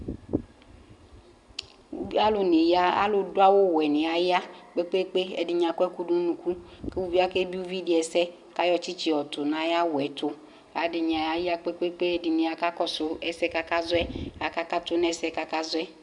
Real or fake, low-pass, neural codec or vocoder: real; 10.8 kHz; none